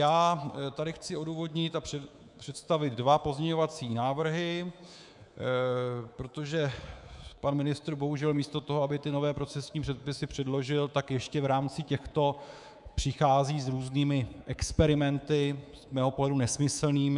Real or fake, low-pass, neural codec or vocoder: fake; 10.8 kHz; codec, 24 kHz, 3.1 kbps, DualCodec